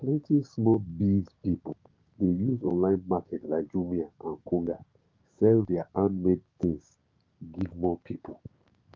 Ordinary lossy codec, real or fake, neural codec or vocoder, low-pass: Opus, 32 kbps; fake; codec, 16 kHz, 6 kbps, DAC; 7.2 kHz